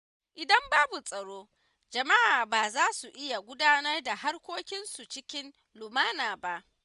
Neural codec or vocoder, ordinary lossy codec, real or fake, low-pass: none; none; real; 10.8 kHz